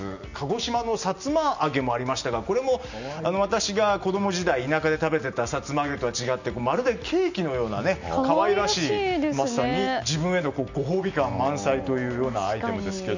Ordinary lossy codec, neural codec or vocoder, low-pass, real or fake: none; none; 7.2 kHz; real